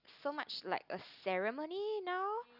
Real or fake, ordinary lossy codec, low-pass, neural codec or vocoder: real; none; 5.4 kHz; none